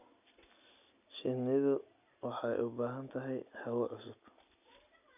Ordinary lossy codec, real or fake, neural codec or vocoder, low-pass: none; real; none; 3.6 kHz